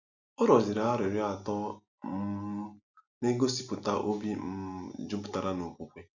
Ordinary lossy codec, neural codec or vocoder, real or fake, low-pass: none; none; real; 7.2 kHz